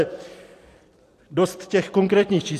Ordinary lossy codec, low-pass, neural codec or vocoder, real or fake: AAC, 64 kbps; 14.4 kHz; vocoder, 44.1 kHz, 128 mel bands every 256 samples, BigVGAN v2; fake